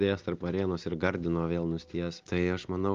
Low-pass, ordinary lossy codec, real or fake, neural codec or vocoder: 7.2 kHz; Opus, 32 kbps; real; none